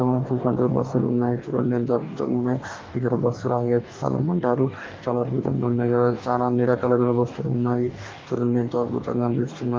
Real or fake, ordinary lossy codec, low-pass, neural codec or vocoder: fake; Opus, 32 kbps; 7.2 kHz; codec, 44.1 kHz, 2.6 kbps, DAC